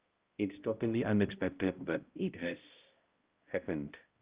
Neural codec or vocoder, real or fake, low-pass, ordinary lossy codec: codec, 16 kHz, 0.5 kbps, X-Codec, HuBERT features, trained on balanced general audio; fake; 3.6 kHz; Opus, 32 kbps